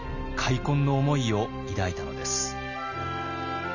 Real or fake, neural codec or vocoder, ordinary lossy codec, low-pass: real; none; none; 7.2 kHz